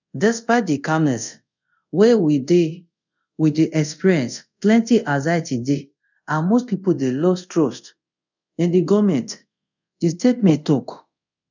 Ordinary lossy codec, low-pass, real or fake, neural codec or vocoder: none; 7.2 kHz; fake; codec, 24 kHz, 0.5 kbps, DualCodec